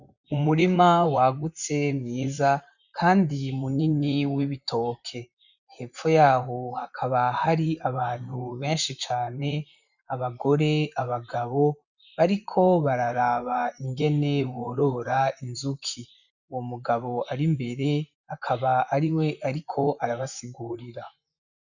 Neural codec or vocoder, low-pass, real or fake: vocoder, 44.1 kHz, 128 mel bands, Pupu-Vocoder; 7.2 kHz; fake